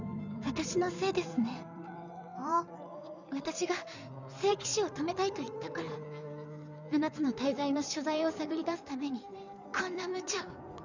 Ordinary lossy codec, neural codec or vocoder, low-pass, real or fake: none; codec, 16 kHz in and 24 kHz out, 2.2 kbps, FireRedTTS-2 codec; 7.2 kHz; fake